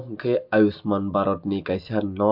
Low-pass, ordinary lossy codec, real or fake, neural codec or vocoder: 5.4 kHz; MP3, 48 kbps; real; none